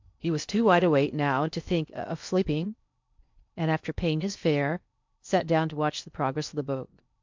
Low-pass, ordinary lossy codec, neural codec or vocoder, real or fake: 7.2 kHz; MP3, 64 kbps; codec, 16 kHz in and 24 kHz out, 0.6 kbps, FocalCodec, streaming, 2048 codes; fake